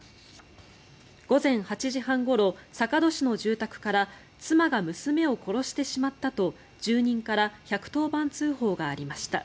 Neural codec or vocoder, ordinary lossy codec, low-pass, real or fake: none; none; none; real